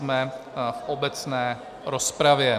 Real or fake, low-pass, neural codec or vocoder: real; 14.4 kHz; none